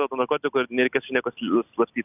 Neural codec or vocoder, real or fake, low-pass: none; real; 3.6 kHz